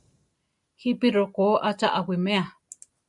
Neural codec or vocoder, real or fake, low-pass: none; real; 10.8 kHz